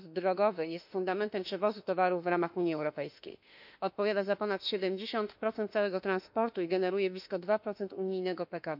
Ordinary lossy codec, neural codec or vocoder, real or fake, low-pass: none; autoencoder, 48 kHz, 32 numbers a frame, DAC-VAE, trained on Japanese speech; fake; 5.4 kHz